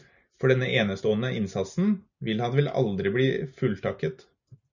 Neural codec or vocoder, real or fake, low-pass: none; real; 7.2 kHz